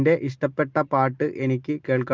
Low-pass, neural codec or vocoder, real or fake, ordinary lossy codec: 7.2 kHz; none; real; Opus, 32 kbps